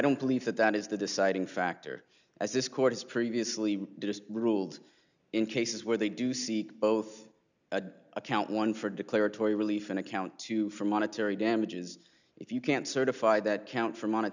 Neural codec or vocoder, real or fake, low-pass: none; real; 7.2 kHz